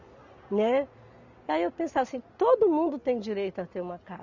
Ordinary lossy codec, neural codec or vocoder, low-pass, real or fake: none; none; 7.2 kHz; real